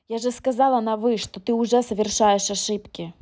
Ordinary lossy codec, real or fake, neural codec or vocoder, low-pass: none; real; none; none